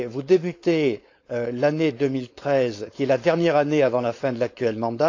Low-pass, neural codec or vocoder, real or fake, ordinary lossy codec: 7.2 kHz; codec, 16 kHz, 4.8 kbps, FACodec; fake; AAC, 48 kbps